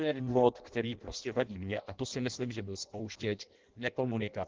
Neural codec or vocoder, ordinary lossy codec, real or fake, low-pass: codec, 16 kHz in and 24 kHz out, 0.6 kbps, FireRedTTS-2 codec; Opus, 16 kbps; fake; 7.2 kHz